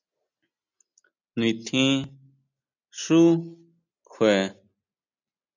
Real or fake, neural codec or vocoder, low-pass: real; none; 7.2 kHz